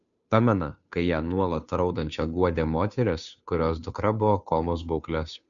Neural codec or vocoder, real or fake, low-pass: codec, 16 kHz, 2 kbps, FunCodec, trained on Chinese and English, 25 frames a second; fake; 7.2 kHz